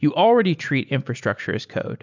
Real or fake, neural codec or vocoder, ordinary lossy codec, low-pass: real; none; MP3, 64 kbps; 7.2 kHz